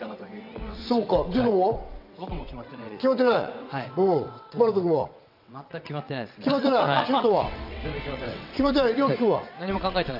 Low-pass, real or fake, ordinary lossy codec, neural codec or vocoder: 5.4 kHz; fake; none; vocoder, 22.05 kHz, 80 mel bands, WaveNeXt